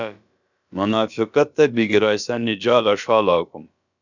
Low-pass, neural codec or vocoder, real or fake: 7.2 kHz; codec, 16 kHz, about 1 kbps, DyCAST, with the encoder's durations; fake